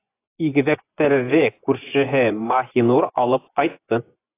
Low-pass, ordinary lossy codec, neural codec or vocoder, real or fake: 3.6 kHz; AAC, 24 kbps; vocoder, 24 kHz, 100 mel bands, Vocos; fake